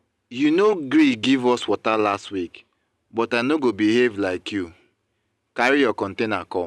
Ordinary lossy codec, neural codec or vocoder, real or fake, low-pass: none; none; real; none